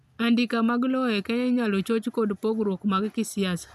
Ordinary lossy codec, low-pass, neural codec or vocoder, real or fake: none; 14.4 kHz; none; real